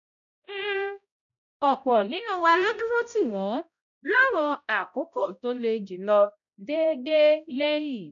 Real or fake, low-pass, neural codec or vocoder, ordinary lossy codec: fake; 7.2 kHz; codec, 16 kHz, 0.5 kbps, X-Codec, HuBERT features, trained on balanced general audio; none